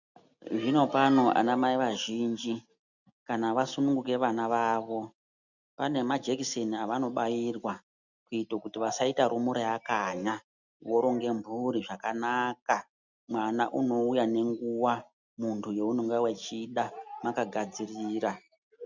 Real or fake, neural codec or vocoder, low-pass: real; none; 7.2 kHz